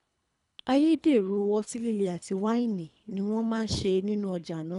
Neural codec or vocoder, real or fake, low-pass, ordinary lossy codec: codec, 24 kHz, 3 kbps, HILCodec; fake; 10.8 kHz; none